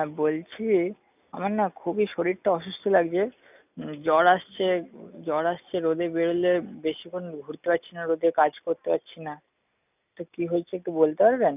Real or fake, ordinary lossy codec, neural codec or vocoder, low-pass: real; none; none; 3.6 kHz